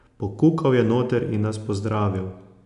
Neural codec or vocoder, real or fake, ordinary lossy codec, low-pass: none; real; none; 10.8 kHz